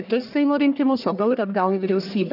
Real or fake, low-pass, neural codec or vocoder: fake; 5.4 kHz; codec, 44.1 kHz, 1.7 kbps, Pupu-Codec